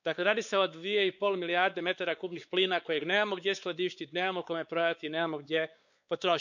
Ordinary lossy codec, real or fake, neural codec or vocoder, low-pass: none; fake; codec, 16 kHz, 4 kbps, X-Codec, WavLM features, trained on Multilingual LibriSpeech; 7.2 kHz